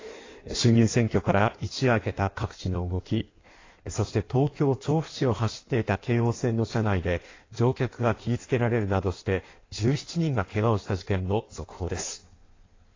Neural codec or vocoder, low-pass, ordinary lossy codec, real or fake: codec, 16 kHz in and 24 kHz out, 1.1 kbps, FireRedTTS-2 codec; 7.2 kHz; AAC, 32 kbps; fake